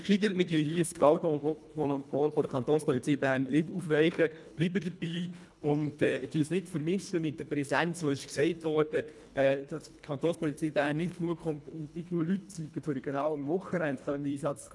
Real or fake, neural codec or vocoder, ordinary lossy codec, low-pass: fake; codec, 24 kHz, 1.5 kbps, HILCodec; none; none